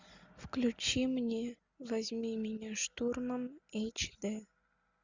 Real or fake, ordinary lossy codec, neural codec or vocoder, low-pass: real; Opus, 64 kbps; none; 7.2 kHz